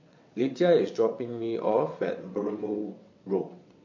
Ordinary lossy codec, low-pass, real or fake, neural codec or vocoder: MP3, 48 kbps; 7.2 kHz; fake; vocoder, 22.05 kHz, 80 mel bands, WaveNeXt